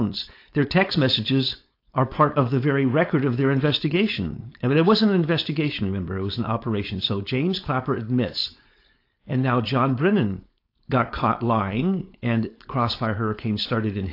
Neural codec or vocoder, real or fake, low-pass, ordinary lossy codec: codec, 16 kHz, 4.8 kbps, FACodec; fake; 5.4 kHz; AAC, 32 kbps